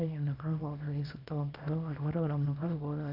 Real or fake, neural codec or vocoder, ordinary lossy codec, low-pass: fake; codec, 24 kHz, 0.9 kbps, WavTokenizer, small release; none; 5.4 kHz